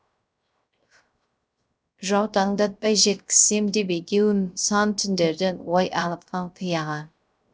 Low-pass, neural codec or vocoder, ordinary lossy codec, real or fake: none; codec, 16 kHz, 0.3 kbps, FocalCodec; none; fake